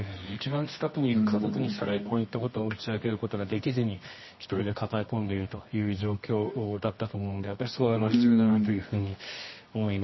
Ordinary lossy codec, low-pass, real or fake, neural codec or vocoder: MP3, 24 kbps; 7.2 kHz; fake; codec, 24 kHz, 0.9 kbps, WavTokenizer, medium music audio release